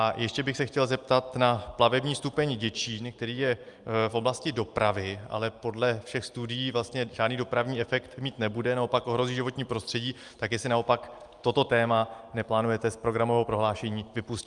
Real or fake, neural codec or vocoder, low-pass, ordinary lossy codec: real; none; 10.8 kHz; Opus, 32 kbps